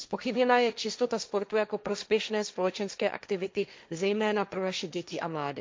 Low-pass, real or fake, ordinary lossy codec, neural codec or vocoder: none; fake; none; codec, 16 kHz, 1.1 kbps, Voila-Tokenizer